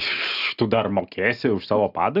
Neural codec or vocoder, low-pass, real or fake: none; 5.4 kHz; real